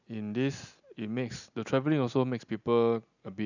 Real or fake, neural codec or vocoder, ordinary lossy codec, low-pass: real; none; none; 7.2 kHz